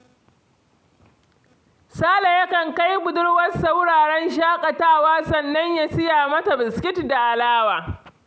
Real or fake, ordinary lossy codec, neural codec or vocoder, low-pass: real; none; none; none